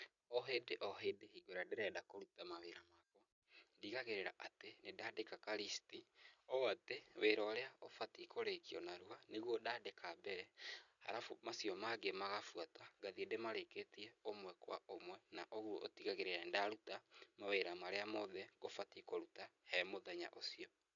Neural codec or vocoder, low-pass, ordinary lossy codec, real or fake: none; 7.2 kHz; none; real